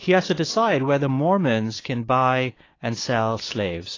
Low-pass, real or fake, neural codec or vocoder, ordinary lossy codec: 7.2 kHz; fake; codec, 16 kHz, 6 kbps, DAC; AAC, 32 kbps